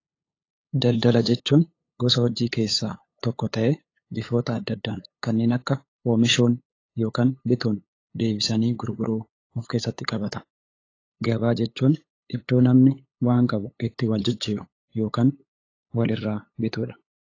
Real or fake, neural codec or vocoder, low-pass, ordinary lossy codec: fake; codec, 16 kHz, 8 kbps, FunCodec, trained on LibriTTS, 25 frames a second; 7.2 kHz; AAC, 32 kbps